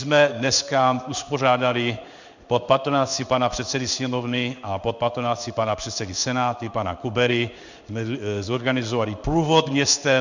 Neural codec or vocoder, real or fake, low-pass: codec, 16 kHz in and 24 kHz out, 1 kbps, XY-Tokenizer; fake; 7.2 kHz